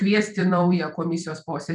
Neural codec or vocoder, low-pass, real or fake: vocoder, 44.1 kHz, 128 mel bands every 256 samples, BigVGAN v2; 10.8 kHz; fake